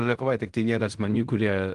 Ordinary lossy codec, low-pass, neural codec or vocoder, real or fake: Opus, 24 kbps; 10.8 kHz; codec, 16 kHz in and 24 kHz out, 0.4 kbps, LongCat-Audio-Codec, fine tuned four codebook decoder; fake